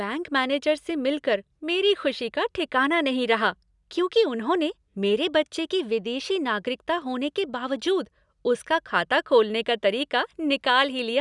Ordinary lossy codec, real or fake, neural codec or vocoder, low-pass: none; real; none; 10.8 kHz